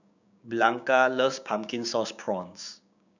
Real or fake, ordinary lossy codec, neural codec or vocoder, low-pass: fake; none; codec, 16 kHz, 6 kbps, DAC; 7.2 kHz